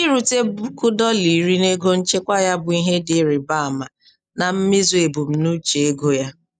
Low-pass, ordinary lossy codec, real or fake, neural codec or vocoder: 9.9 kHz; none; real; none